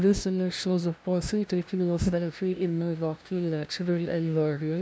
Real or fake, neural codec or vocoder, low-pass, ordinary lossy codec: fake; codec, 16 kHz, 0.5 kbps, FunCodec, trained on LibriTTS, 25 frames a second; none; none